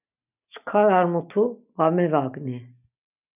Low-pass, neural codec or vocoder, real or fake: 3.6 kHz; none; real